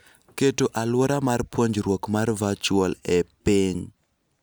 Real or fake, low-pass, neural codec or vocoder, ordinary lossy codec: real; none; none; none